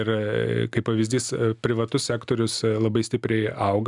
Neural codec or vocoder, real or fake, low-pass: none; real; 10.8 kHz